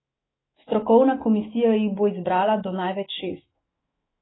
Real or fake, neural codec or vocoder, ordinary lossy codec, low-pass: fake; autoencoder, 48 kHz, 128 numbers a frame, DAC-VAE, trained on Japanese speech; AAC, 16 kbps; 7.2 kHz